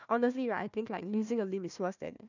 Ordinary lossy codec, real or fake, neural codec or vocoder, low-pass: none; fake; codec, 16 kHz, 1 kbps, FunCodec, trained on Chinese and English, 50 frames a second; 7.2 kHz